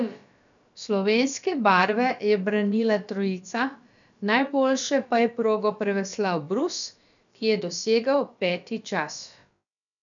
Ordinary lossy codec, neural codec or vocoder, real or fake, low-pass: none; codec, 16 kHz, about 1 kbps, DyCAST, with the encoder's durations; fake; 7.2 kHz